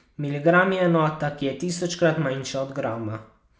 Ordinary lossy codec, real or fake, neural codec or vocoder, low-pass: none; real; none; none